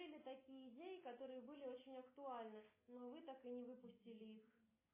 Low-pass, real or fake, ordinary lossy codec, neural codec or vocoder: 3.6 kHz; real; MP3, 16 kbps; none